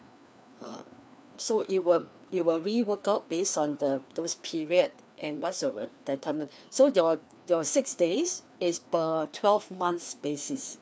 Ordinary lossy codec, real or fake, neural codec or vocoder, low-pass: none; fake; codec, 16 kHz, 2 kbps, FreqCodec, larger model; none